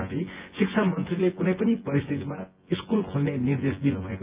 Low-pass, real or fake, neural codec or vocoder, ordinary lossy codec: 3.6 kHz; fake; vocoder, 24 kHz, 100 mel bands, Vocos; Opus, 32 kbps